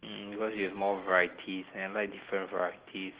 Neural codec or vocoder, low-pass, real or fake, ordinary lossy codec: none; 3.6 kHz; real; Opus, 16 kbps